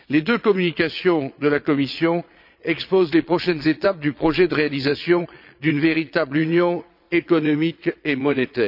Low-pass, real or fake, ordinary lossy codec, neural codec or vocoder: 5.4 kHz; fake; none; vocoder, 22.05 kHz, 80 mel bands, Vocos